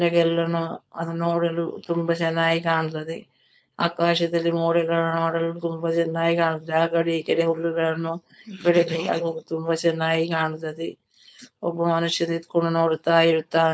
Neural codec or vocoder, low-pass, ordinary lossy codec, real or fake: codec, 16 kHz, 4.8 kbps, FACodec; none; none; fake